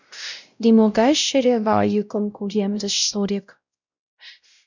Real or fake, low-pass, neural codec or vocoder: fake; 7.2 kHz; codec, 16 kHz, 0.5 kbps, X-Codec, WavLM features, trained on Multilingual LibriSpeech